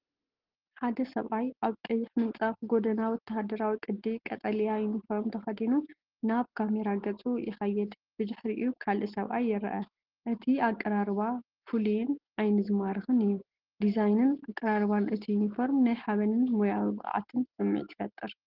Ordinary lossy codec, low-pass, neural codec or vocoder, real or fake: Opus, 16 kbps; 5.4 kHz; none; real